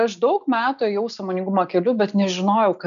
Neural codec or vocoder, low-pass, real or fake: none; 7.2 kHz; real